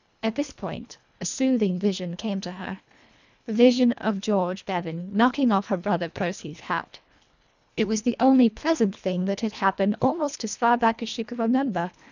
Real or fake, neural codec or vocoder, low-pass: fake; codec, 24 kHz, 1.5 kbps, HILCodec; 7.2 kHz